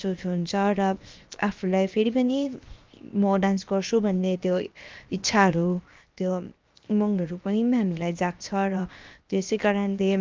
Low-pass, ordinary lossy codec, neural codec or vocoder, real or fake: 7.2 kHz; Opus, 32 kbps; codec, 16 kHz, 0.7 kbps, FocalCodec; fake